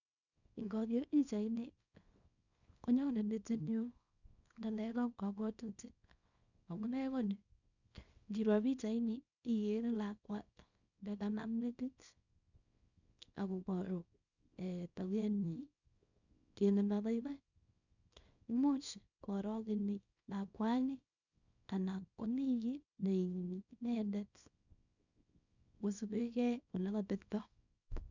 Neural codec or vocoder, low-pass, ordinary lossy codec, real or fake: codec, 24 kHz, 0.9 kbps, WavTokenizer, small release; 7.2 kHz; none; fake